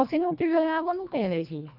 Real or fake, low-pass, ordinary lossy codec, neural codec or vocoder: fake; 5.4 kHz; none; codec, 24 kHz, 1.5 kbps, HILCodec